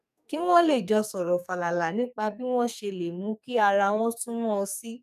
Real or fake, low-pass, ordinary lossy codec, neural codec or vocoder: fake; 14.4 kHz; none; codec, 44.1 kHz, 2.6 kbps, SNAC